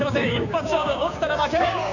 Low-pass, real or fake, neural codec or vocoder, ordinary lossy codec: 7.2 kHz; fake; codec, 16 kHz, 6 kbps, DAC; none